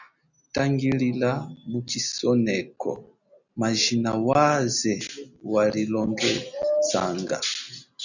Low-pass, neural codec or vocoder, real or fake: 7.2 kHz; none; real